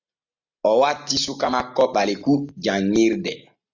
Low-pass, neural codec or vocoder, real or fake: 7.2 kHz; none; real